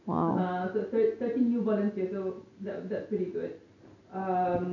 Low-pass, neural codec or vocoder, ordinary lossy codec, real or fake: 7.2 kHz; none; none; real